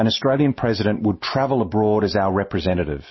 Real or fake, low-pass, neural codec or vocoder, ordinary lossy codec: real; 7.2 kHz; none; MP3, 24 kbps